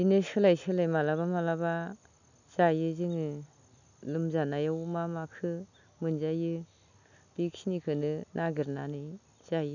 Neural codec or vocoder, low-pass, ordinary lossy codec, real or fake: none; 7.2 kHz; none; real